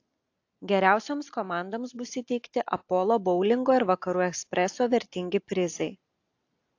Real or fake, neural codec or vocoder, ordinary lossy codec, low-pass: real; none; AAC, 48 kbps; 7.2 kHz